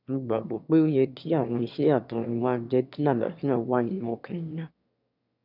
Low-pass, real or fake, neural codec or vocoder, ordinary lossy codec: 5.4 kHz; fake; autoencoder, 22.05 kHz, a latent of 192 numbers a frame, VITS, trained on one speaker; none